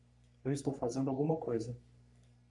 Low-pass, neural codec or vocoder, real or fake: 10.8 kHz; codec, 44.1 kHz, 3.4 kbps, Pupu-Codec; fake